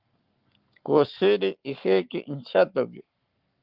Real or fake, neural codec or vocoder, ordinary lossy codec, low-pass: fake; codec, 44.1 kHz, 7.8 kbps, Pupu-Codec; Opus, 24 kbps; 5.4 kHz